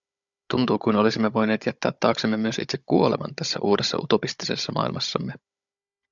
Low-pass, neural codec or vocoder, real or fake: 7.2 kHz; codec, 16 kHz, 16 kbps, FunCodec, trained on Chinese and English, 50 frames a second; fake